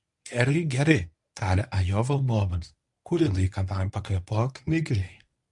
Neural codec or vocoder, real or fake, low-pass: codec, 24 kHz, 0.9 kbps, WavTokenizer, medium speech release version 1; fake; 10.8 kHz